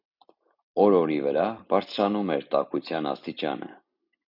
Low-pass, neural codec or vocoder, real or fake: 5.4 kHz; none; real